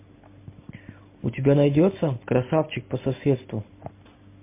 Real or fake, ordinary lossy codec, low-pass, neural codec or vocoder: real; MP3, 24 kbps; 3.6 kHz; none